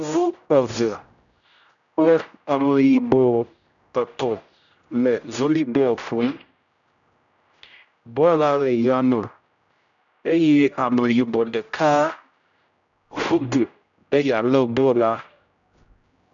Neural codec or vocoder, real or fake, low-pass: codec, 16 kHz, 0.5 kbps, X-Codec, HuBERT features, trained on general audio; fake; 7.2 kHz